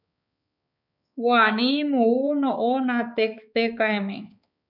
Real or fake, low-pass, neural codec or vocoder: fake; 5.4 kHz; codec, 16 kHz, 4 kbps, X-Codec, HuBERT features, trained on balanced general audio